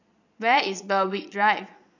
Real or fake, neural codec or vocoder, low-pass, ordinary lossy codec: fake; vocoder, 22.05 kHz, 80 mel bands, Vocos; 7.2 kHz; none